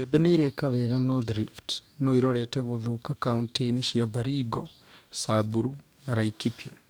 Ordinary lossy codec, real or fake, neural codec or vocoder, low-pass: none; fake; codec, 44.1 kHz, 2.6 kbps, DAC; none